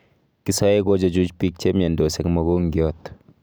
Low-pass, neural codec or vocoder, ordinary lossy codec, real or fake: none; none; none; real